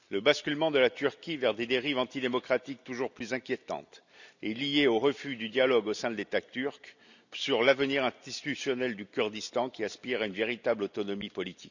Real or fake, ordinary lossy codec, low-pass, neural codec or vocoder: real; none; 7.2 kHz; none